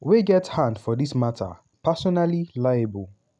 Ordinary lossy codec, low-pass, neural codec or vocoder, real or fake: none; 10.8 kHz; none; real